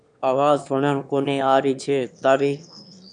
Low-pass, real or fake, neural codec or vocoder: 9.9 kHz; fake; autoencoder, 22.05 kHz, a latent of 192 numbers a frame, VITS, trained on one speaker